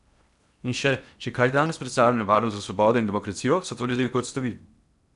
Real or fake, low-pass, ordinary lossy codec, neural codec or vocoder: fake; 10.8 kHz; none; codec, 16 kHz in and 24 kHz out, 0.8 kbps, FocalCodec, streaming, 65536 codes